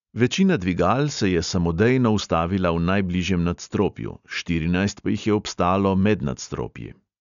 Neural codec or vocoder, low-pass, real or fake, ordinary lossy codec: none; 7.2 kHz; real; none